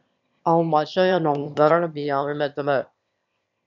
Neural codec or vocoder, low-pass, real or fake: autoencoder, 22.05 kHz, a latent of 192 numbers a frame, VITS, trained on one speaker; 7.2 kHz; fake